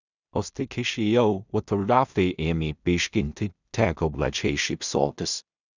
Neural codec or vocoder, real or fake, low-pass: codec, 16 kHz in and 24 kHz out, 0.4 kbps, LongCat-Audio-Codec, two codebook decoder; fake; 7.2 kHz